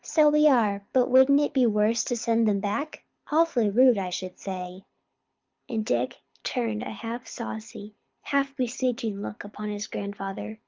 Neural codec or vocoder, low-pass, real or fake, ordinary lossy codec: codec, 24 kHz, 6 kbps, HILCodec; 7.2 kHz; fake; Opus, 24 kbps